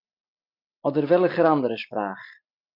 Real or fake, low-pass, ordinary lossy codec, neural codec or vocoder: real; 5.4 kHz; MP3, 48 kbps; none